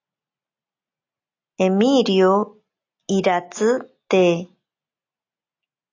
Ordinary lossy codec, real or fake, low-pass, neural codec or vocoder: AAC, 48 kbps; real; 7.2 kHz; none